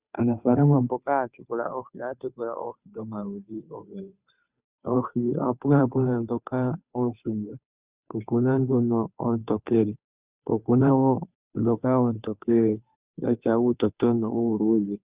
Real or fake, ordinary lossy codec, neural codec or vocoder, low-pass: fake; Opus, 64 kbps; codec, 16 kHz, 2 kbps, FunCodec, trained on Chinese and English, 25 frames a second; 3.6 kHz